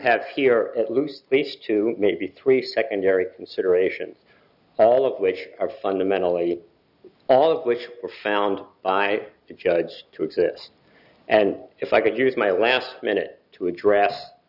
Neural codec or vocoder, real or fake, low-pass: none; real; 5.4 kHz